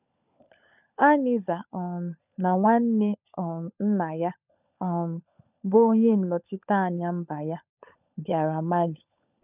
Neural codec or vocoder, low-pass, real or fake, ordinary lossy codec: codec, 16 kHz, 16 kbps, FunCodec, trained on LibriTTS, 50 frames a second; 3.6 kHz; fake; none